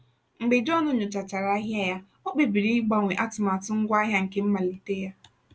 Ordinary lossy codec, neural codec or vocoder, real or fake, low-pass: none; none; real; none